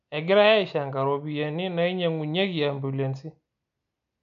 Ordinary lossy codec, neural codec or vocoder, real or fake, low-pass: none; none; real; 7.2 kHz